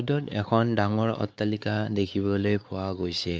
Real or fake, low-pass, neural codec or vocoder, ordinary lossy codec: fake; none; codec, 16 kHz, 4 kbps, X-Codec, WavLM features, trained on Multilingual LibriSpeech; none